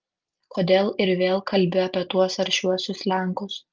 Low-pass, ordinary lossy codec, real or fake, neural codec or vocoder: 7.2 kHz; Opus, 24 kbps; real; none